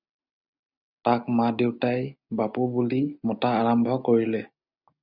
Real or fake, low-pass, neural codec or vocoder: real; 5.4 kHz; none